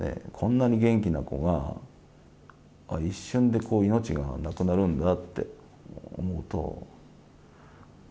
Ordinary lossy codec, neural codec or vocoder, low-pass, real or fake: none; none; none; real